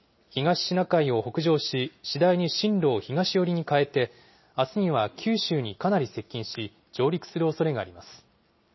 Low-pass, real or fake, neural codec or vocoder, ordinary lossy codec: 7.2 kHz; real; none; MP3, 24 kbps